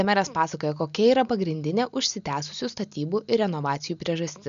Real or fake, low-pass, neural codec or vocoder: real; 7.2 kHz; none